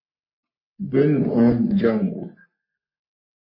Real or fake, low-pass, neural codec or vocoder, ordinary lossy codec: fake; 5.4 kHz; codec, 44.1 kHz, 3.4 kbps, Pupu-Codec; MP3, 24 kbps